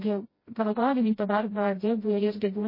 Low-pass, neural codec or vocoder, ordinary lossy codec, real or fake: 5.4 kHz; codec, 16 kHz, 0.5 kbps, FreqCodec, smaller model; MP3, 24 kbps; fake